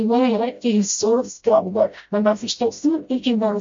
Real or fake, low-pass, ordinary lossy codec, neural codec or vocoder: fake; 7.2 kHz; MP3, 48 kbps; codec, 16 kHz, 0.5 kbps, FreqCodec, smaller model